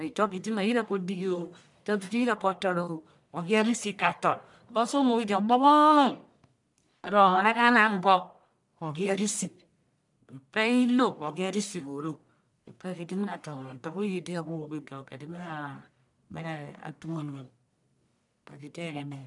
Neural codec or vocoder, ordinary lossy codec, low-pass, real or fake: codec, 44.1 kHz, 1.7 kbps, Pupu-Codec; none; 10.8 kHz; fake